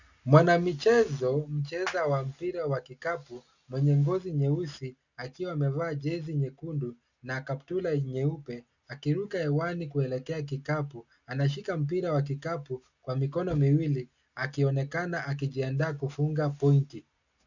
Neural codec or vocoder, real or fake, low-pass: none; real; 7.2 kHz